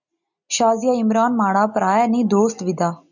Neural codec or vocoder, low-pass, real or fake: none; 7.2 kHz; real